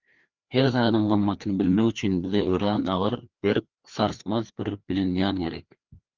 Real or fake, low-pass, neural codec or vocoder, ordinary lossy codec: fake; 7.2 kHz; codec, 16 kHz, 2 kbps, FreqCodec, larger model; Opus, 32 kbps